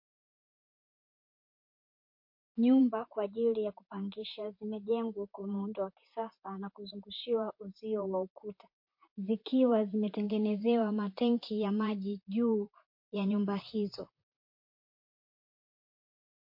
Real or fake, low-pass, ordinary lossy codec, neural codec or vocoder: fake; 5.4 kHz; MP3, 32 kbps; vocoder, 44.1 kHz, 128 mel bands, Pupu-Vocoder